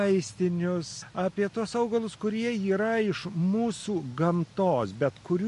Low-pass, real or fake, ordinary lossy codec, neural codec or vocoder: 14.4 kHz; real; MP3, 48 kbps; none